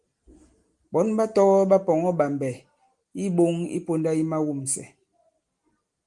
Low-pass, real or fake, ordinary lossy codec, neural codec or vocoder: 10.8 kHz; real; Opus, 24 kbps; none